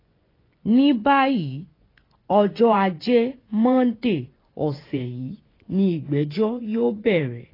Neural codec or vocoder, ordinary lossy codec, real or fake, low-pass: vocoder, 24 kHz, 100 mel bands, Vocos; AAC, 24 kbps; fake; 5.4 kHz